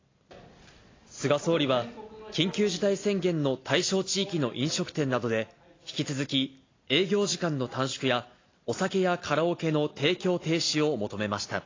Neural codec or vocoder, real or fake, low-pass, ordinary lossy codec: none; real; 7.2 kHz; AAC, 32 kbps